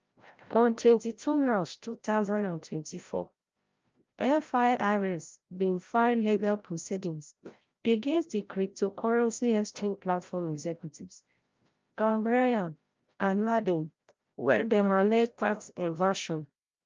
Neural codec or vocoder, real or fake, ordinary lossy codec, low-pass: codec, 16 kHz, 0.5 kbps, FreqCodec, larger model; fake; Opus, 24 kbps; 7.2 kHz